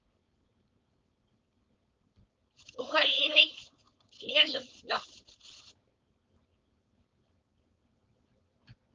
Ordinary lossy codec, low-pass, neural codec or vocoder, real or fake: Opus, 24 kbps; 7.2 kHz; codec, 16 kHz, 4.8 kbps, FACodec; fake